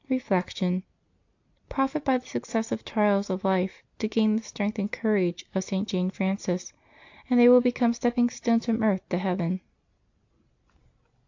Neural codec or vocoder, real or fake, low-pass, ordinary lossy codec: none; real; 7.2 kHz; AAC, 48 kbps